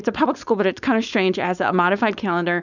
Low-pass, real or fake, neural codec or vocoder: 7.2 kHz; fake; codec, 16 kHz, 4.8 kbps, FACodec